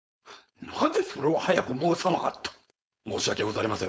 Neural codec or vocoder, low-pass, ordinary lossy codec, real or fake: codec, 16 kHz, 4.8 kbps, FACodec; none; none; fake